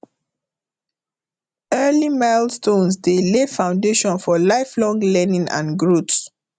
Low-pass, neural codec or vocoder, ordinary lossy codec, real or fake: 9.9 kHz; none; none; real